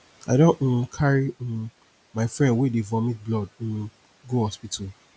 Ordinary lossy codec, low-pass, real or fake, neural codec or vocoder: none; none; real; none